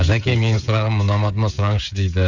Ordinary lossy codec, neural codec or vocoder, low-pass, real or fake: none; codec, 16 kHz, 16 kbps, FreqCodec, smaller model; 7.2 kHz; fake